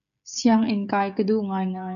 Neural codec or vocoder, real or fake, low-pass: codec, 16 kHz, 16 kbps, FreqCodec, smaller model; fake; 7.2 kHz